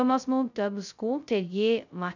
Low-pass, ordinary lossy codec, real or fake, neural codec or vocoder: 7.2 kHz; none; fake; codec, 16 kHz, 0.2 kbps, FocalCodec